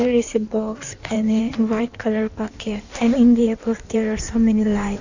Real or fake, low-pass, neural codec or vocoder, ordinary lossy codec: fake; 7.2 kHz; codec, 16 kHz in and 24 kHz out, 1.1 kbps, FireRedTTS-2 codec; none